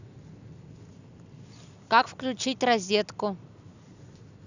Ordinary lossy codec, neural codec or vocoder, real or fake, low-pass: none; none; real; 7.2 kHz